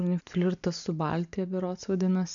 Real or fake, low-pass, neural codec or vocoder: real; 7.2 kHz; none